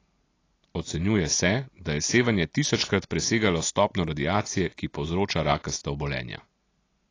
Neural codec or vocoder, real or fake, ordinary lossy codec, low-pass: none; real; AAC, 32 kbps; 7.2 kHz